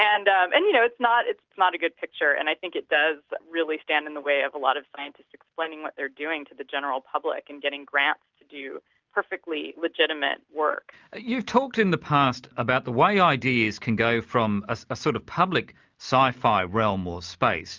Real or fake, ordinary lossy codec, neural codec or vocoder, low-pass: real; Opus, 24 kbps; none; 7.2 kHz